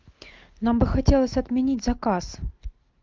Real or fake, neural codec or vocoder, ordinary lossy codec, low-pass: real; none; Opus, 24 kbps; 7.2 kHz